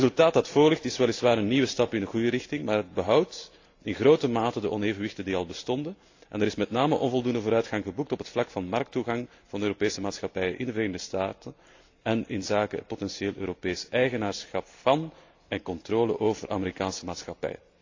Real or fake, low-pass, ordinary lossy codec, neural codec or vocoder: real; 7.2 kHz; AAC, 48 kbps; none